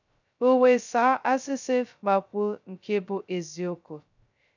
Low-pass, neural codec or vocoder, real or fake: 7.2 kHz; codec, 16 kHz, 0.2 kbps, FocalCodec; fake